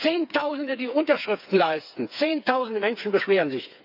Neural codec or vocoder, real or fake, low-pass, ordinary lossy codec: codec, 16 kHz, 4 kbps, FreqCodec, smaller model; fake; 5.4 kHz; none